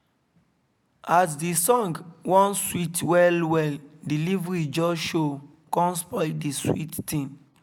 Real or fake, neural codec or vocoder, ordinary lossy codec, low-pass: real; none; none; none